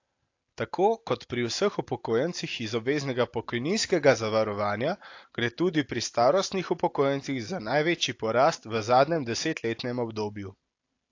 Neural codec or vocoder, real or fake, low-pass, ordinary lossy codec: none; real; 7.2 kHz; AAC, 48 kbps